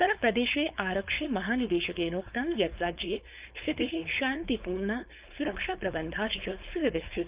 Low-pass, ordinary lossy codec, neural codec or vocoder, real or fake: 3.6 kHz; Opus, 32 kbps; codec, 16 kHz, 4.8 kbps, FACodec; fake